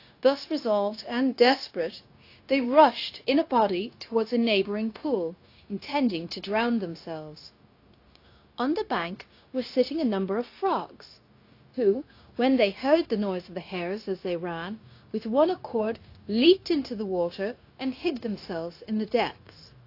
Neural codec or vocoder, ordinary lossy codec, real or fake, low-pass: codec, 16 kHz, 0.9 kbps, LongCat-Audio-Codec; AAC, 32 kbps; fake; 5.4 kHz